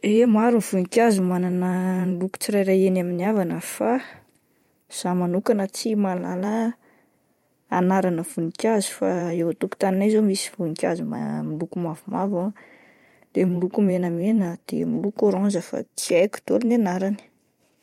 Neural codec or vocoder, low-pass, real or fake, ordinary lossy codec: vocoder, 44.1 kHz, 128 mel bands every 512 samples, BigVGAN v2; 19.8 kHz; fake; MP3, 64 kbps